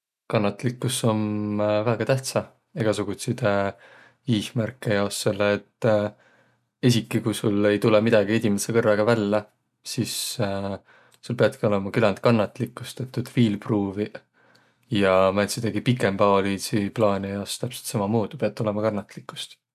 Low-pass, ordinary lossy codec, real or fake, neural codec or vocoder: 14.4 kHz; none; real; none